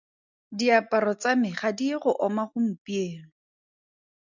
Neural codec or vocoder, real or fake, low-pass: none; real; 7.2 kHz